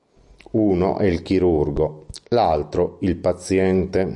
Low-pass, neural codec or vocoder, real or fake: 10.8 kHz; none; real